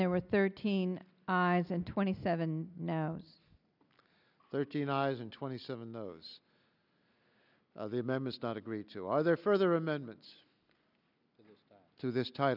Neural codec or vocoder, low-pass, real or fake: none; 5.4 kHz; real